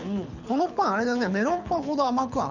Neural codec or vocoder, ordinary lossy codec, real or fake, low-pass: codec, 24 kHz, 6 kbps, HILCodec; none; fake; 7.2 kHz